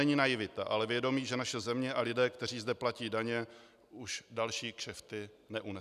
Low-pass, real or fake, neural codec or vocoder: 14.4 kHz; real; none